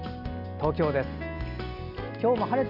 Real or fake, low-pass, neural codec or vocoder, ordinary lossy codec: real; 5.4 kHz; none; none